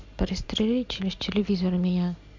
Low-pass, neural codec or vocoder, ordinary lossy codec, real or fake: 7.2 kHz; none; MP3, 64 kbps; real